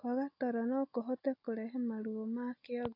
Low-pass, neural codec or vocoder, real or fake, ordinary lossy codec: 5.4 kHz; none; real; AAC, 32 kbps